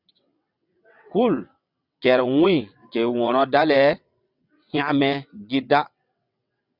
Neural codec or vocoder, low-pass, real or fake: vocoder, 22.05 kHz, 80 mel bands, WaveNeXt; 5.4 kHz; fake